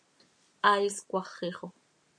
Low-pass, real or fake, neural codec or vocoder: 9.9 kHz; real; none